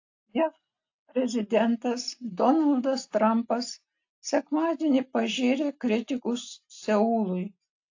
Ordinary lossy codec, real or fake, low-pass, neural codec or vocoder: AAC, 48 kbps; real; 7.2 kHz; none